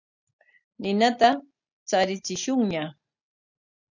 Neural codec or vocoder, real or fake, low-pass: none; real; 7.2 kHz